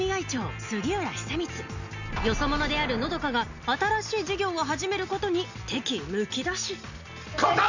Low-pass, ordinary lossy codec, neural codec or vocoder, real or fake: 7.2 kHz; none; none; real